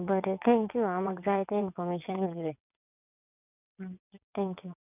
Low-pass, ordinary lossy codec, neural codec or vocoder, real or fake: 3.6 kHz; none; vocoder, 22.05 kHz, 80 mel bands, WaveNeXt; fake